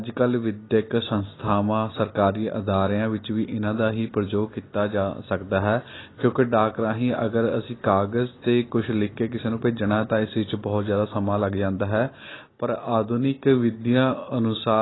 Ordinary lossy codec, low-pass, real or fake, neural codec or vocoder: AAC, 16 kbps; 7.2 kHz; real; none